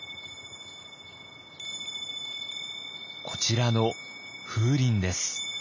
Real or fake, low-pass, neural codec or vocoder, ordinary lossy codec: real; 7.2 kHz; none; none